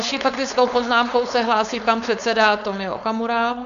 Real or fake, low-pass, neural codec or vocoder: fake; 7.2 kHz; codec, 16 kHz, 4.8 kbps, FACodec